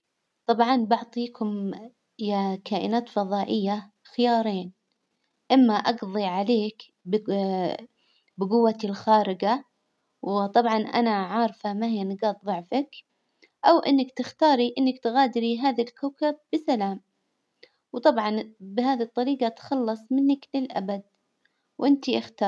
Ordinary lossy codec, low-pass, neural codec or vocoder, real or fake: none; none; none; real